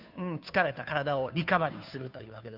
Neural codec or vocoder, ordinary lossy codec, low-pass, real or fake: codec, 16 kHz, 2 kbps, FunCodec, trained on Chinese and English, 25 frames a second; none; 5.4 kHz; fake